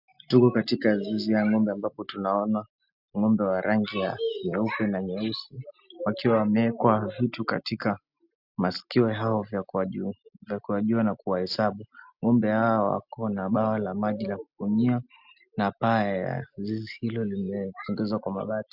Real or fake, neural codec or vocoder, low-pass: real; none; 5.4 kHz